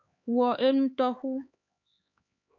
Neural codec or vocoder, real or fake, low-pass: codec, 16 kHz, 4 kbps, X-Codec, HuBERT features, trained on LibriSpeech; fake; 7.2 kHz